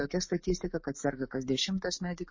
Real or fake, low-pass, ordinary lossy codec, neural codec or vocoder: fake; 7.2 kHz; MP3, 32 kbps; autoencoder, 48 kHz, 128 numbers a frame, DAC-VAE, trained on Japanese speech